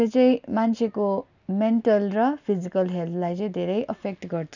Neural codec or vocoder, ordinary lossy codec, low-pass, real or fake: none; none; 7.2 kHz; real